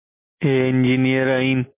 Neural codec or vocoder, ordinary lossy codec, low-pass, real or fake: none; MP3, 24 kbps; 3.6 kHz; real